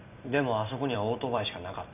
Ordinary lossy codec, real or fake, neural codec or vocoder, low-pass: none; fake; vocoder, 44.1 kHz, 128 mel bands every 256 samples, BigVGAN v2; 3.6 kHz